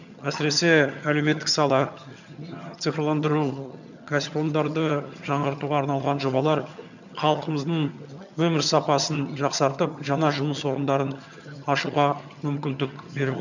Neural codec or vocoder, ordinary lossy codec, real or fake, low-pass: vocoder, 22.05 kHz, 80 mel bands, HiFi-GAN; none; fake; 7.2 kHz